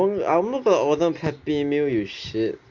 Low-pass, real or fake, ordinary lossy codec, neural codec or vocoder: 7.2 kHz; real; none; none